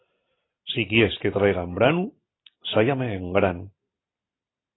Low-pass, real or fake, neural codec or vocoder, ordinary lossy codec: 7.2 kHz; real; none; AAC, 16 kbps